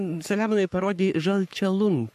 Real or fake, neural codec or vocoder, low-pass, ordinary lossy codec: fake; codec, 44.1 kHz, 3.4 kbps, Pupu-Codec; 14.4 kHz; MP3, 64 kbps